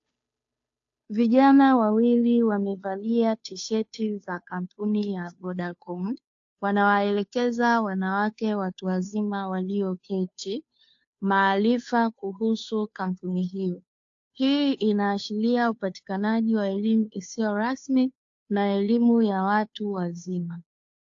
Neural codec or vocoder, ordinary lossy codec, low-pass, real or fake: codec, 16 kHz, 2 kbps, FunCodec, trained on Chinese and English, 25 frames a second; AAC, 48 kbps; 7.2 kHz; fake